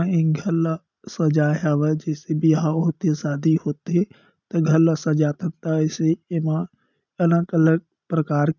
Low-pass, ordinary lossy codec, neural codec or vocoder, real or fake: 7.2 kHz; none; none; real